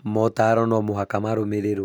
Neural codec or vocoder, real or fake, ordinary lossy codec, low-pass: none; real; none; none